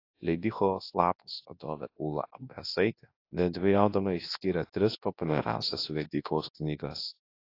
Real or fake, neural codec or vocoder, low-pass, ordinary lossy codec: fake; codec, 24 kHz, 0.9 kbps, WavTokenizer, large speech release; 5.4 kHz; AAC, 32 kbps